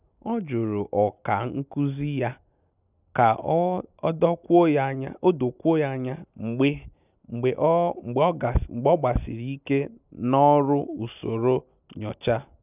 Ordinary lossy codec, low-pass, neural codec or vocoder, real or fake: none; 3.6 kHz; none; real